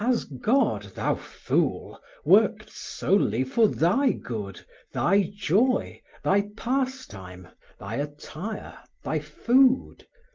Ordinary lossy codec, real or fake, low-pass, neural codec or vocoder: Opus, 32 kbps; real; 7.2 kHz; none